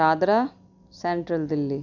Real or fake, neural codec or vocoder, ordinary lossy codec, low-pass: real; none; none; 7.2 kHz